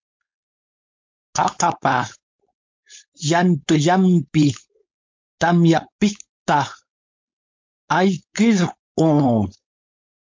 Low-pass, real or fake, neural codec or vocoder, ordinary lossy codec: 7.2 kHz; fake; codec, 16 kHz, 4.8 kbps, FACodec; MP3, 48 kbps